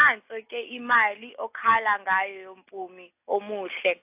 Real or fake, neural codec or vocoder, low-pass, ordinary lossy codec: real; none; 3.6 kHz; AAC, 32 kbps